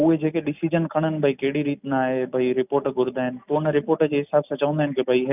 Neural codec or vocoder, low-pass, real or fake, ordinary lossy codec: none; 3.6 kHz; real; none